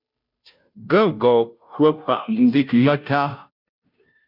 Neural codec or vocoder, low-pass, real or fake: codec, 16 kHz, 0.5 kbps, FunCodec, trained on Chinese and English, 25 frames a second; 5.4 kHz; fake